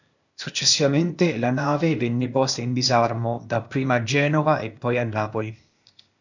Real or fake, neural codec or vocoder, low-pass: fake; codec, 16 kHz, 0.8 kbps, ZipCodec; 7.2 kHz